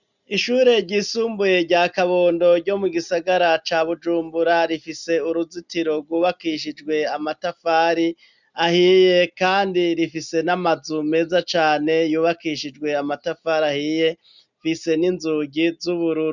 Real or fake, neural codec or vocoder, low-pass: real; none; 7.2 kHz